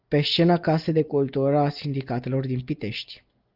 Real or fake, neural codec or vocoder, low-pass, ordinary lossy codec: real; none; 5.4 kHz; Opus, 32 kbps